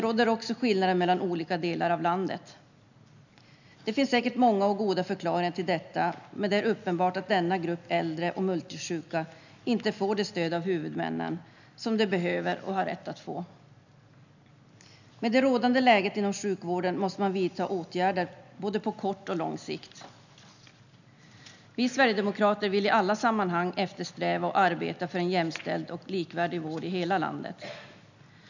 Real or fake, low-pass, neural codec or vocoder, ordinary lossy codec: real; 7.2 kHz; none; none